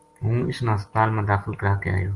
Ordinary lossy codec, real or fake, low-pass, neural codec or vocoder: Opus, 24 kbps; real; 10.8 kHz; none